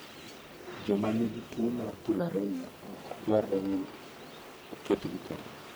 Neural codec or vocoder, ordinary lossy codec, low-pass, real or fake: codec, 44.1 kHz, 1.7 kbps, Pupu-Codec; none; none; fake